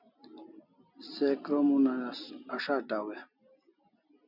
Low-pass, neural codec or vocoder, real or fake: 5.4 kHz; none; real